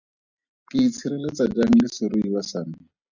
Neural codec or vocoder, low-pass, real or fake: none; 7.2 kHz; real